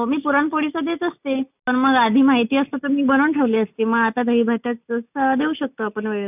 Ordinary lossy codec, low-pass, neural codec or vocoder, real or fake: none; 3.6 kHz; none; real